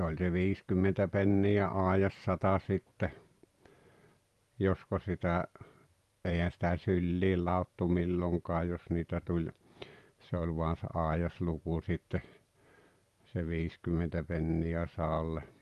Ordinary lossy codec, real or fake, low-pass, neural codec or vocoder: Opus, 32 kbps; fake; 14.4 kHz; vocoder, 44.1 kHz, 128 mel bands every 512 samples, BigVGAN v2